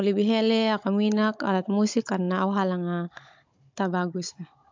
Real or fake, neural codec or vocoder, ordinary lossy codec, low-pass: fake; codec, 16 kHz, 16 kbps, FunCodec, trained on Chinese and English, 50 frames a second; MP3, 64 kbps; 7.2 kHz